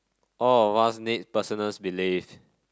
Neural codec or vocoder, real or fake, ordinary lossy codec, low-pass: none; real; none; none